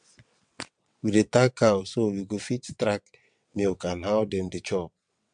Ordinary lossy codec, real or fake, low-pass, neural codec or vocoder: MP3, 64 kbps; fake; 9.9 kHz; vocoder, 22.05 kHz, 80 mel bands, WaveNeXt